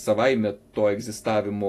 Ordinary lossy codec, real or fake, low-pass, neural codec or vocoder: AAC, 48 kbps; real; 14.4 kHz; none